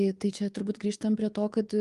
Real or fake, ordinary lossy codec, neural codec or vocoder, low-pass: fake; Opus, 24 kbps; codec, 24 kHz, 3.1 kbps, DualCodec; 10.8 kHz